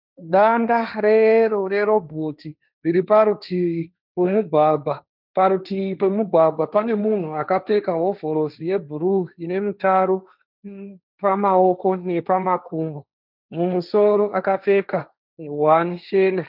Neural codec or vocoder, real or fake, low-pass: codec, 16 kHz, 1.1 kbps, Voila-Tokenizer; fake; 5.4 kHz